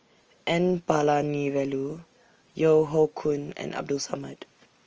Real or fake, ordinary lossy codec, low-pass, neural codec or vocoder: real; Opus, 24 kbps; 7.2 kHz; none